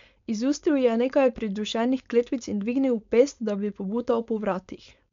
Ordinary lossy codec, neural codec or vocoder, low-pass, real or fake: MP3, 64 kbps; codec, 16 kHz, 4.8 kbps, FACodec; 7.2 kHz; fake